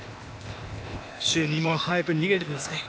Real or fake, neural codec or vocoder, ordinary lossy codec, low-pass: fake; codec, 16 kHz, 0.8 kbps, ZipCodec; none; none